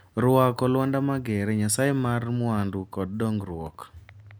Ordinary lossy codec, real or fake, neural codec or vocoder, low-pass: none; real; none; none